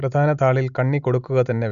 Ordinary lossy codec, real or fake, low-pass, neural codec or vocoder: none; real; 7.2 kHz; none